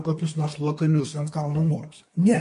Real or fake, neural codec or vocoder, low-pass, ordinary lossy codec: fake; codec, 24 kHz, 1 kbps, SNAC; 10.8 kHz; MP3, 48 kbps